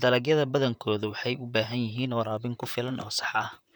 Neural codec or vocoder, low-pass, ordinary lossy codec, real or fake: vocoder, 44.1 kHz, 128 mel bands, Pupu-Vocoder; none; none; fake